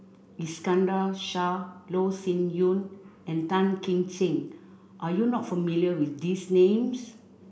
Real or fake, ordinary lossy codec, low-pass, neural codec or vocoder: real; none; none; none